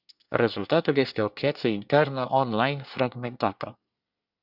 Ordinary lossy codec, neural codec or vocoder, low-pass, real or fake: Opus, 64 kbps; codec, 24 kHz, 1 kbps, SNAC; 5.4 kHz; fake